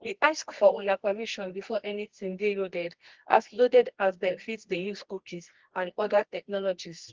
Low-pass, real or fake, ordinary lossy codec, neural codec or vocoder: 7.2 kHz; fake; Opus, 16 kbps; codec, 24 kHz, 0.9 kbps, WavTokenizer, medium music audio release